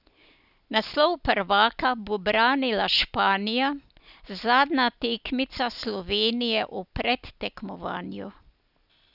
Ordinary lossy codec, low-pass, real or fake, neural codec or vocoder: none; 5.4 kHz; real; none